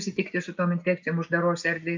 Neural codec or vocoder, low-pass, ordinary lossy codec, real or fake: none; 7.2 kHz; MP3, 48 kbps; real